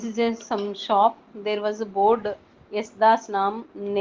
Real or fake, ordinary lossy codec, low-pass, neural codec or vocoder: real; Opus, 16 kbps; 7.2 kHz; none